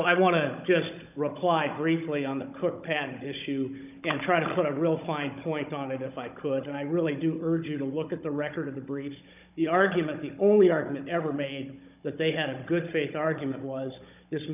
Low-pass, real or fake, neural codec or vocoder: 3.6 kHz; fake; codec, 16 kHz, 16 kbps, FunCodec, trained on Chinese and English, 50 frames a second